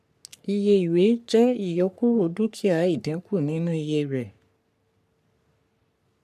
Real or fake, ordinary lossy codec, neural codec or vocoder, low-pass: fake; none; codec, 44.1 kHz, 3.4 kbps, Pupu-Codec; 14.4 kHz